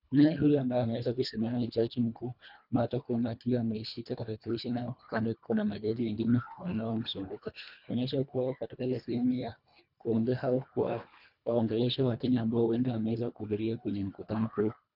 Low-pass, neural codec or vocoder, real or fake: 5.4 kHz; codec, 24 kHz, 1.5 kbps, HILCodec; fake